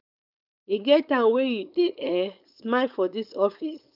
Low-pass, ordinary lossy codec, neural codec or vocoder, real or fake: 5.4 kHz; none; codec, 16 kHz, 4.8 kbps, FACodec; fake